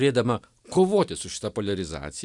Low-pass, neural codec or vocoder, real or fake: 10.8 kHz; none; real